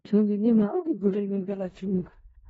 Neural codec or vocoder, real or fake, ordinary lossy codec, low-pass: codec, 16 kHz in and 24 kHz out, 0.4 kbps, LongCat-Audio-Codec, four codebook decoder; fake; AAC, 24 kbps; 10.8 kHz